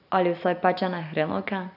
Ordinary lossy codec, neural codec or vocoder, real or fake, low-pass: none; none; real; 5.4 kHz